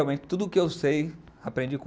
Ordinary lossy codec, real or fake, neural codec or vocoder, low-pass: none; real; none; none